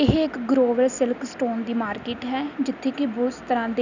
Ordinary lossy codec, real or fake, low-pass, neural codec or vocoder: none; real; 7.2 kHz; none